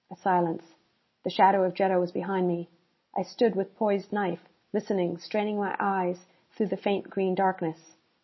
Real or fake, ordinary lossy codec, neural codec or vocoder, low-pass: real; MP3, 24 kbps; none; 7.2 kHz